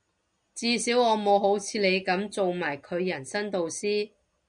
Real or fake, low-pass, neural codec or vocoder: real; 9.9 kHz; none